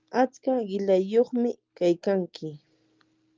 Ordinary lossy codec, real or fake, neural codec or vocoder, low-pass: Opus, 32 kbps; real; none; 7.2 kHz